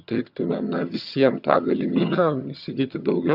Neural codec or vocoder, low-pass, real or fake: vocoder, 22.05 kHz, 80 mel bands, HiFi-GAN; 5.4 kHz; fake